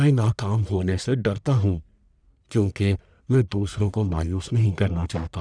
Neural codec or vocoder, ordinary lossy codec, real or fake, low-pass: codec, 44.1 kHz, 1.7 kbps, Pupu-Codec; none; fake; 9.9 kHz